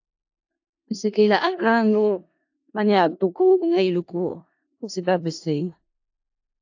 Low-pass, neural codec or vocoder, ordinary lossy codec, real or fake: 7.2 kHz; codec, 16 kHz in and 24 kHz out, 0.4 kbps, LongCat-Audio-Codec, four codebook decoder; AAC, 48 kbps; fake